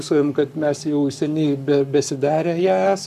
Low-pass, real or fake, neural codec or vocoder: 14.4 kHz; fake; codec, 44.1 kHz, 7.8 kbps, Pupu-Codec